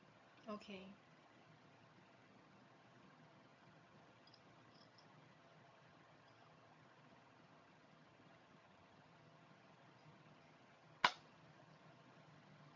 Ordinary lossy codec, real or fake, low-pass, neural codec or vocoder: MP3, 48 kbps; fake; 7.2 kHz; vocoder, 22.05 kHz, 80 mel bands, HiFi-GAN